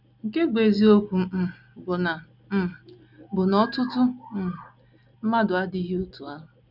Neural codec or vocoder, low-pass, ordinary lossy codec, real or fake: none; 5.4 kHz; none; real